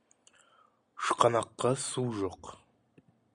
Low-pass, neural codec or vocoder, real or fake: 9.9 kHz; none; real